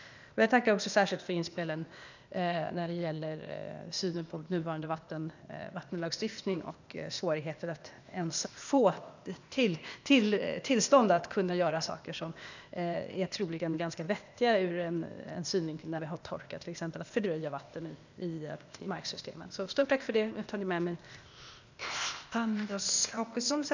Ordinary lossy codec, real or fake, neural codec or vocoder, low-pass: none; fake; codec, 16 kHz, 0.8 kbps, ZipCodec; 7.2 kHz